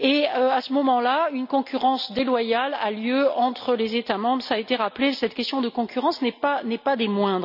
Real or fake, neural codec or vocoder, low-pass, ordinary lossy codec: real; none; 5.4 kHz; none